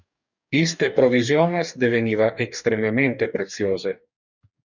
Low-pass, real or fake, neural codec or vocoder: 7.2 kHz; fake; codec, 44.1 kHz, 2.6 kbps, DAC